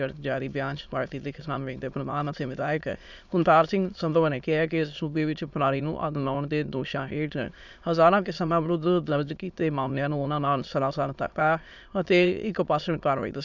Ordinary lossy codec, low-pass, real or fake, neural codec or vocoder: none; 7.2 kHz; fake; autoencoder, 22.05 kHz, a latent of 192 numbers a frame, VITS, trained on many speakers